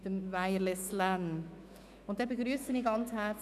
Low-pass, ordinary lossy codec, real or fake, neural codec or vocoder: 14.4 kHz; none; fake; autoencoder, 48 kHz, 128 numbers a frame, DAC-VAE, trained on Japanese speech